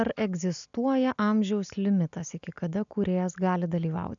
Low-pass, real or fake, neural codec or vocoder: 7.2 kHz; real; none